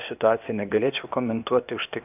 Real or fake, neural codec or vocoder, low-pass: fake; codec, 16 kHz, 0.8 kbps, ZipCodec; 3.6 kHz